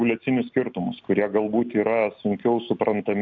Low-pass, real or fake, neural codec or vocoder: 7.2 kHz; real; none